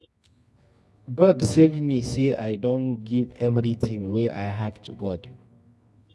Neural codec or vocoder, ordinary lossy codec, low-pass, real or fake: codec, 24 kHz, 0.9 kbps, WavTokenizer, medium music audio release; none; none; fake